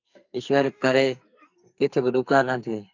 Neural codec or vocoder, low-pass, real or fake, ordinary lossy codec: codec, 44.1 kHz, 2.6 kbps, SNAC; 7.2 kHz; fake; AAC, 48 kbps